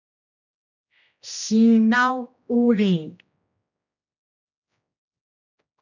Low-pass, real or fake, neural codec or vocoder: 7.2 kHz; fake; codec, 16 kHz, 1 kbps, X-Codec, HuBERT features, trained on general audio